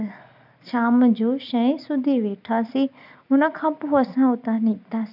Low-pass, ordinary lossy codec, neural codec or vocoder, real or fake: 5.4 kHz; none; none; real